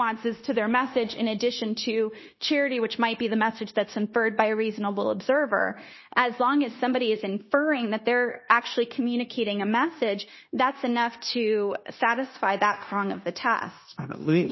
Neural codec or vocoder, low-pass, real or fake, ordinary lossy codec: codec, 16 kHz, 0.9 kbps, LongCat-Audio-Codec; 7.2 kHz; fake; MP3, 24 kbps